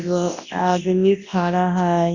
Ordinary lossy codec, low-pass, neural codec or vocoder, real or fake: Opus, 64 kbps; 7.2 kHz; codec, 24 kHz, 0.9 kbps, WavTokenizer, large speech release; fake